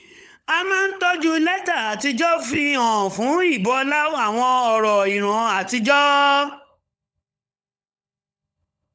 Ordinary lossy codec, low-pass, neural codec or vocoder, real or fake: none; none; codec, 16 kHz, 16 kbps, FunCodec, trained on LibriTTS, 50 frames a second; fake